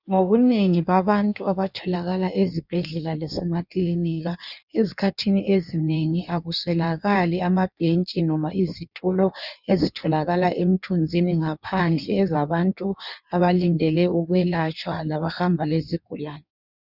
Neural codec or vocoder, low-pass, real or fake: codec, 16 kHz in and 24 kHz out, 1.1 kbps, FireRedTTS-2 codec; 5.4 kHz; fake